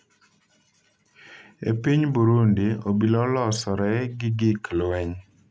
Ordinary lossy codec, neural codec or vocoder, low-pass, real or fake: none; none; none; real